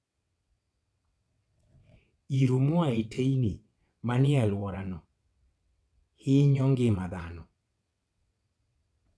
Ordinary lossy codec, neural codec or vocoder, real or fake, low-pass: none; vocoder, 22.05 kHz, 80 mel bands, WaveNeXt; fake; none